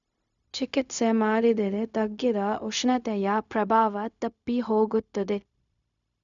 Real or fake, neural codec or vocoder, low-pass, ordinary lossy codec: fake; codec, 16 kHz, 0.4 kbps, LongCat-Audio-Codec; 7.2 kHz; none